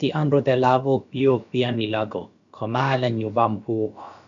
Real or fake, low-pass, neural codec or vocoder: fake; 7.2 kHz; codec, 16 kHz, about 1 kbps, DyCAST, with the encoder's durations